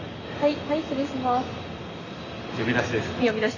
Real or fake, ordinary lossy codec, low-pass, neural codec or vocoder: real; none; 7.2 kHz; none